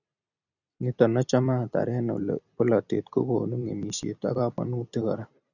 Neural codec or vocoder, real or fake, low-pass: vocoder, 44.1 kHz, 128 mel bands every 256 samples, BigVGAN v2; fake; 7.2 kHz